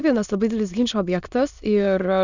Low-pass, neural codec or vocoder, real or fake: 7.2 kHz; autoencoder, 22.05 kHz, a latent of 192 numbers a frame, VITS, trained on many speakers; fake